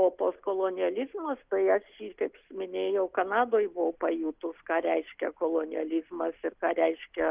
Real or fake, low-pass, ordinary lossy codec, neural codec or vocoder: real; 3.6 kHz; Opus, 32 kbps; none